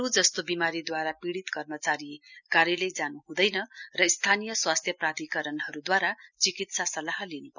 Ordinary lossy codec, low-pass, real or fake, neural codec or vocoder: none; 7.2 kHz; real; none